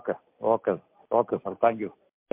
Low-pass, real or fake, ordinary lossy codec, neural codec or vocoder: 3.6 kHz; real; MP3, 32 kbps; none